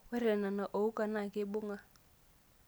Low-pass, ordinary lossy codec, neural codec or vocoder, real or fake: none; none; none; real